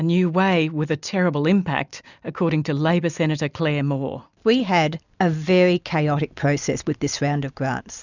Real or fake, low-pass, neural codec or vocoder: real; 7.2 kHz; none